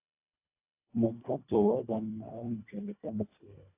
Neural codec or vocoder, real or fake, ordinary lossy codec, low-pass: codec, 24 kHz, 1.5 kbps, HILCodec; fake; AAC, 24 kbps; 3.6 kHz